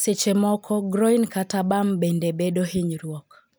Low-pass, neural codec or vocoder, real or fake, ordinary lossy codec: none; none; real; none